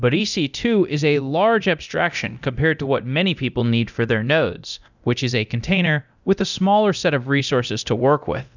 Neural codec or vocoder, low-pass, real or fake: codec, 24 kHz, 0.9 kbps, DualCodec; 7.2 kHz; fake